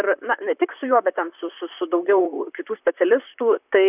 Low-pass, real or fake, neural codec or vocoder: 3.6 kHz; fake; vocoder, 44.1 kHz, 128 mel bands, Pupu-Vocoder